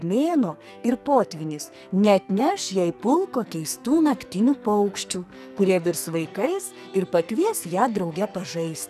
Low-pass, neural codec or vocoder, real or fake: 14.4 kHz; codec, 44.1 kHz, 2.6 kbps, SNAC; fake